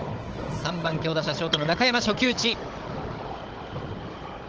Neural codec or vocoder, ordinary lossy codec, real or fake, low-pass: codec, 16 kHz, 16 kbps, FunCodec, trained on Chinese and English, 50 frames a second; Opus, 16 kbps; fake; 7.2 kHz